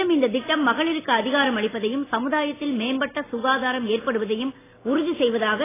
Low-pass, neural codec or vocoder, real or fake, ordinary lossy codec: 3.6 kHz; none; real; AAC, 16 kbps